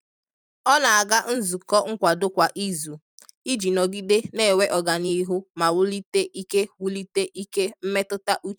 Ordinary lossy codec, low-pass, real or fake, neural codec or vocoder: none; none; fake; vocoder, 44.1 kHz, 128 mel bands every 512 samples, BigVGAN v2